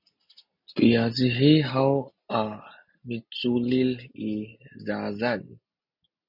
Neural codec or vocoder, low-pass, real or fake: none; 5.4 kHz; real